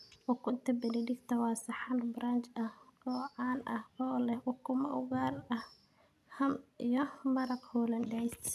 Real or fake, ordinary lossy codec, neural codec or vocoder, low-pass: real; none; none; 14.4 kHz